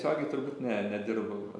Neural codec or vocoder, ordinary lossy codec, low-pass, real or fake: none; AAC, 64 kbps; 10.8 kHz; real